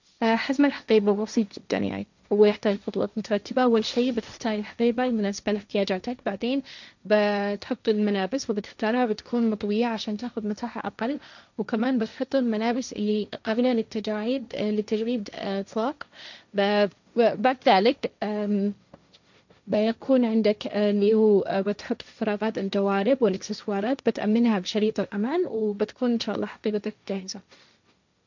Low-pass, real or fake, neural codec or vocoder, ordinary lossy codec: 7.2 kHz; fake; codec, 16 kHz, 1.1 kbps, Voila-Tokenizer; none